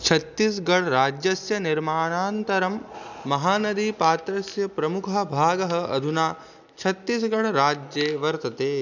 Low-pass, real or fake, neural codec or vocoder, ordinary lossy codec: 7.2 kHz; real; none; none